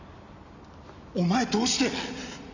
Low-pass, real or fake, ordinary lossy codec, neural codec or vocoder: 7.2 kHz; real; none; none